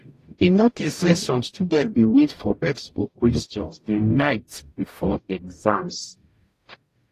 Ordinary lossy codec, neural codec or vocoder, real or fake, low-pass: AAC, 48 kbps; codec, 44.1 kHz, 0.9 kbps, DAC; fake; 14.4 kHz